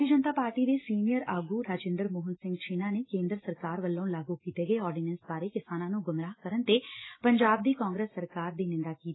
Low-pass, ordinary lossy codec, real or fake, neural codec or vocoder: 7.2 kHz; AAC, 16 kbps; real; none